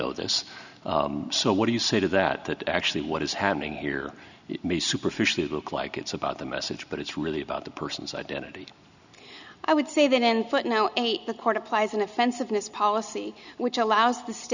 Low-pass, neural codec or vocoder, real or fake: 7.2 kHz; none; real